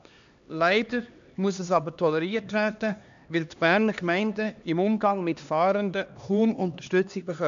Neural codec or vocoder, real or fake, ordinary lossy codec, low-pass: codec, 16 kHz, 2 kbps, X-Codec, HuBERT features, trained on LibriSpeech; fake; MP3, 64 kbps; 7.2 kHz